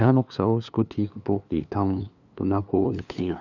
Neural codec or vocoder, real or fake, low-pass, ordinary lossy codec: codec, 16 kHz, 2 kbps, FunCodec, trained on LibriTTS, 25 frames a second; fake; 7.2 kHz; none